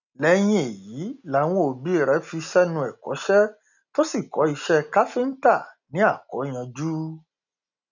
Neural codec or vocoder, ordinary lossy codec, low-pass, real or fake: none; none; 7.2 kHz; real